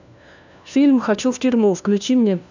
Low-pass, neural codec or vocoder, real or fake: 7.2 kHz; codec, 16 kHz, 1 kbps, FunCodec, trained on LibriTTS, 50 frames a second; fake